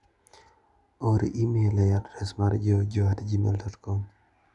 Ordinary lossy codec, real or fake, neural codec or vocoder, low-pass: none; real; none; 10.8 kHz